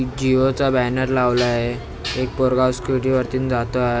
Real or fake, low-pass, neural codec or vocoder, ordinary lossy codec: real; none; none; none